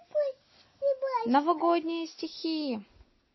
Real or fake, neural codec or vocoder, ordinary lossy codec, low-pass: real; none; MP3, 24 kbps; 7.2 kHz